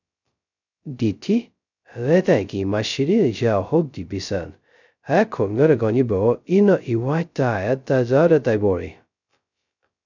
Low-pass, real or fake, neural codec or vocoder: 7.2 kHz; fake; codec, 16 kHz, 0.2 kbps, FocalCodec